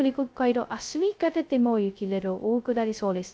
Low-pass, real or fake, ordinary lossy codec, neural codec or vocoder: none; fake; none; codec, 16 kHz, 0.2 kbps, FocalCodec